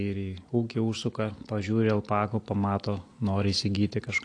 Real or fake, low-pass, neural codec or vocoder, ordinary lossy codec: real; 9.9 kHz; none; AAC, 48 kbps